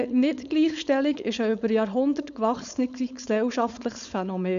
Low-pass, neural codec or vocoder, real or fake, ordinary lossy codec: 7.2 kHz; codec, 16 kHz, 4.8 kbps, FACodec; fake; none